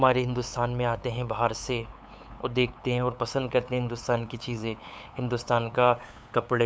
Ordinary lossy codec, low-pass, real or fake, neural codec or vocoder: none; none; fake; codec, 16 kHz, 8 kbps, FunCodec, trained on LibriTTS, 25 frames a second